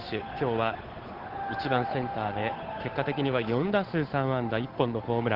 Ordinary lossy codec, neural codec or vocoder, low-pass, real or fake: Opus, 16 kbps; codec, 16 kHz, 8 kbps, FunCodec, trained on Chinese and English, 25 frames a second; 5.4 kHz; fake